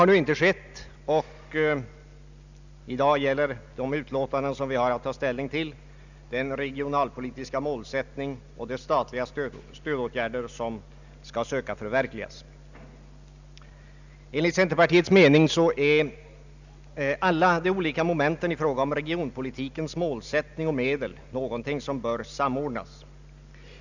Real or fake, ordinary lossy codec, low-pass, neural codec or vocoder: real; none; 7.2 kHz; none